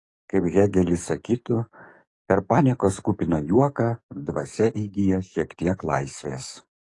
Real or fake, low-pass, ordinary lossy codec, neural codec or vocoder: fake; 10.8 kHz; AAC, 48 kbps; codec, 44.1 kHz, 7.8 kbps, DAC